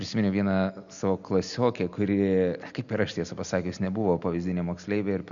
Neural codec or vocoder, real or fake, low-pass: none; real; 7.2 kHz